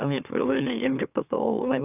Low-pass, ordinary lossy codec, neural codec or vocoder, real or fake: 3.6 kHz; none; autoencoder, 44.1 kHz, a latent of 192 numbers a frame, MeloTTS; fake